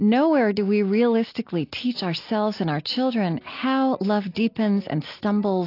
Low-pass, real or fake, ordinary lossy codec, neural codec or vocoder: 5.4 kHz; real; AAC, 32 kbps; none